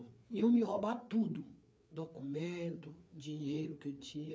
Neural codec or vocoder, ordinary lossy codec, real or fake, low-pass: codec, 16 kHz, 4 kbps, FreqCodec, larger model; none; fake; none